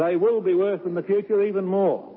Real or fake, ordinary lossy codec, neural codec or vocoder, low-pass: real; MP3, 24 kbps; none; 7.2 kHz